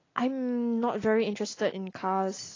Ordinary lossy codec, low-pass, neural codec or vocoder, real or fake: AAC, 32 kbps; 7.2 kHz; none; real